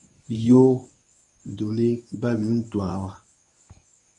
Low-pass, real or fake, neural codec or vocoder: 10.8 kHz; fake; codec, 24 kHz, 0.9 kbps, WavTokenizer, medium speech release version 1